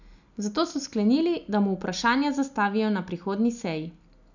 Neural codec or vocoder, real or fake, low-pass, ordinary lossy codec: none; real; 7.2 kHz; none